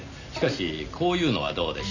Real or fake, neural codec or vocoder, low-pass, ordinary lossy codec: real; none; 7.2 kHz; none